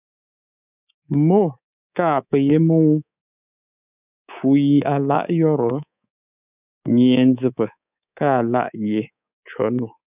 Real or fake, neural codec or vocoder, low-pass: fake; codec, 24 kHz, 3.1 kbps, DualCodec; 3.6 kHz